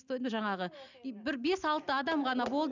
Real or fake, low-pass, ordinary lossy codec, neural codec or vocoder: real; 7.2 kHz; none; none